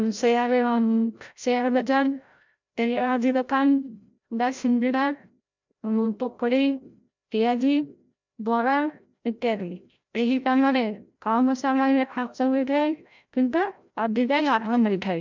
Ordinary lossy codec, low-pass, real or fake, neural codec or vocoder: MP3, 64 kbps; 7.2 kHz; fake; codec, 16 kHz, 0.5 kbps, FreqCodec, larger model